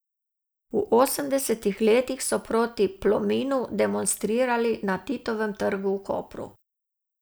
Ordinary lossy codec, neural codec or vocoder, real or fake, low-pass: none; none; real; none